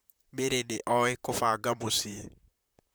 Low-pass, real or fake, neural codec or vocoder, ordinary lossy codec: none; fake; vocoder, 44.1 kHz, 128 mel bands, Pupu-Vocoder; none